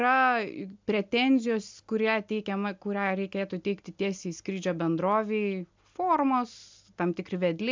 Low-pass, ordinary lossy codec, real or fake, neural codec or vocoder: 7.2 kHz; MP3, 64 kbps; real; none